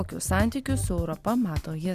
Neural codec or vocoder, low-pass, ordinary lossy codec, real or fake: none; 14.4 kHz; Opus, 64 kbps; real